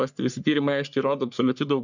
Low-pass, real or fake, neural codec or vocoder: 7.2 kHz; fake; codec, 44.1 kHz, 3.4 kbps, Pupu-Codec